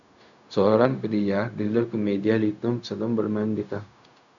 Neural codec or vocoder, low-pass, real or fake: codec, 16 kHz, 0.4 kbps, LongCat-Audio-Codec; 7.2 kHz; fake